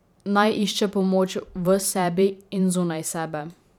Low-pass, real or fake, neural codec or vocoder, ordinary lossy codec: 19.8 kHz; fake; vocoder, 44.1 kHz, 128 mel bands every 512 samples, BigVGAN v2; none